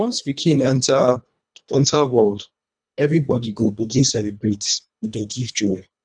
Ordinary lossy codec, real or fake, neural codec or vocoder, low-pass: none; fake; codec, 24 kHz, 1.5 kbps, HILCodec; 9.9 kHz